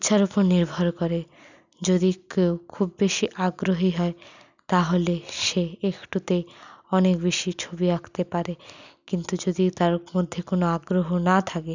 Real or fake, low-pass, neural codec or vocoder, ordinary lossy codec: real; 7.2 kHz; none; none